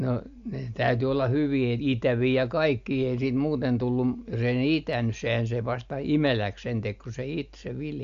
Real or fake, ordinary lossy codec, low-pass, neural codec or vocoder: real; AAC, 64 kbps; 7.2 kHz; none